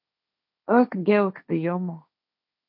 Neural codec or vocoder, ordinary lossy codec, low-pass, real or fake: codec, 16 kHz, 1.1 kbps, Voila-Tokenizer; MP3, 48 kbps; 5.4 kHz; fake